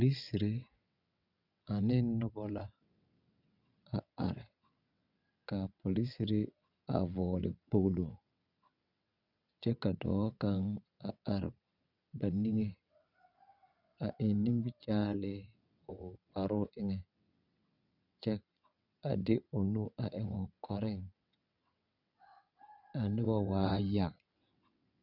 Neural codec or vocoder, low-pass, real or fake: vocoder, 22.05 kHz, 80 mel bands, WaveNeXt; 5.4 kHz; fake